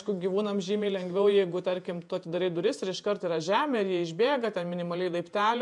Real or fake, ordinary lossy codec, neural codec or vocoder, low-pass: fake; MP3, 64 kbps; vocoder, 48 kHz, 128 mel bands, Vocos; 10.8 kHz